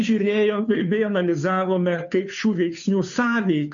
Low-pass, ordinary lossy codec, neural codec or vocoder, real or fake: 7.2 kHz; MP3, 96 kbps; codec, 16 kHz, 2 kbps, FunCodec, trained on Chinese and English, 25 frames a second; fake